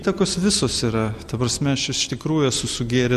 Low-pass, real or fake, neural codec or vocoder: 14.4 kHz; real; none